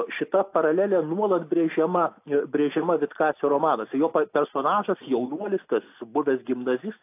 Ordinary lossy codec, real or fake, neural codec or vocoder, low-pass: AAC, 24 kbps; real; none; 3.6 kHz